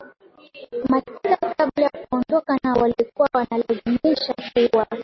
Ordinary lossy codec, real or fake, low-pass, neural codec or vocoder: MP3, 24 kbps; real; 7.2 kHz; none